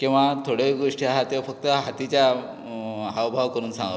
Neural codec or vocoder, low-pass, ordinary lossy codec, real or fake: none; none; none; real